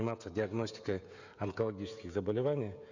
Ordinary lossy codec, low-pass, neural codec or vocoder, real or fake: none; 7.2 kHz; codec, 16 kHz, 16 kbps, FreqCodec, smaller model; fake